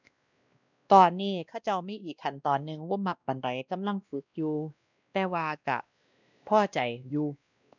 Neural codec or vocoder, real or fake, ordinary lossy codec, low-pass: codec, 16 kHz, 1 kbps, X-Codec, WavLM features, trained on Multilingual LibriSpeech; fake; none; 7.2 kHz